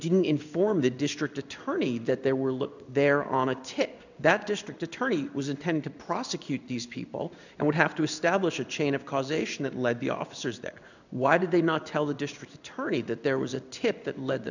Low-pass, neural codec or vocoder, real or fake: 7.2 kHz; codec, 16 kHz in and 24 kHz out, 1 kbps, XY-Tokenizer; fake